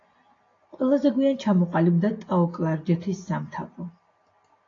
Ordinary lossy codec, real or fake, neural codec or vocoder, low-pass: AAC, 32 kbps; real; none; 7.2 kHz